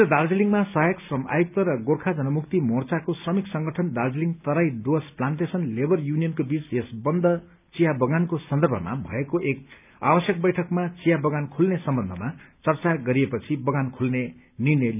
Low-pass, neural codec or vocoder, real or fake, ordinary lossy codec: 3.6 kHz; none; real; none